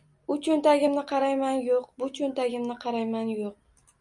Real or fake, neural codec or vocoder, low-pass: real; none; 10.8 kHz